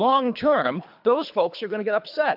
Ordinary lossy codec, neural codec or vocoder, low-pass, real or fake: AAC, 48 kbps; codec, 24 kHz, 3 kbps, HILCodec; 5.4 kHz; fake